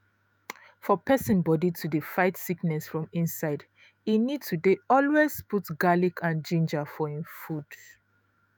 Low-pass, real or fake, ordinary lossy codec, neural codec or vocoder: none; fake; none; autoencoder, 48 kHz, 128 numbers a frame, DAC-VAE, trained on Japanese speech